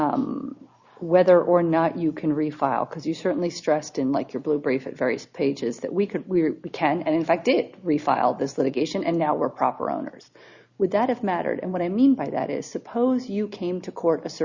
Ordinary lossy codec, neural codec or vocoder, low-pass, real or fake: Opus, 64 kbps; none; 7.2 kHz; real